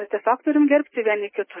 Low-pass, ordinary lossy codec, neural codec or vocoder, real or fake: 3.6 kHz; MP3, 16 kbps; none; real